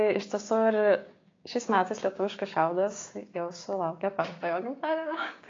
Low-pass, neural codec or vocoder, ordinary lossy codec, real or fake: 7.2 kHz; codec, 16 kHz, 6 kbps, DAC; AAC, 32 kbps; fake